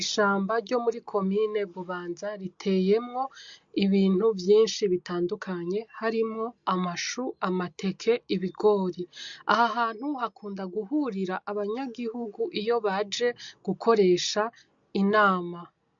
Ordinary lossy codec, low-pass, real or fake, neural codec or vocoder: MP3, 48 kbps; 7.2 kHz; real; none